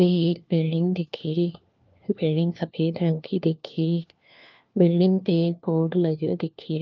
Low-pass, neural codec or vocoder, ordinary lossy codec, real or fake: 7.2 kHz; codec, 16 kHz, 1 kbps, FunCodec, trained on LibriTTS, 50 frames a second; Opus, 24 kbps; fake